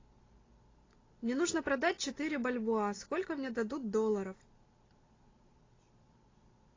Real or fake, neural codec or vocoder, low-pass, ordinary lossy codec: real; none; 7.2 kHz; AAC, 32 kbps